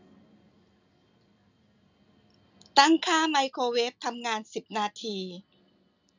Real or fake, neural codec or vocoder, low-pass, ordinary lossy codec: real; none; 7.2 kHz; none